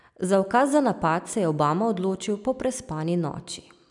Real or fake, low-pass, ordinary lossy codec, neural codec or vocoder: real; 10.8 kHz; none; none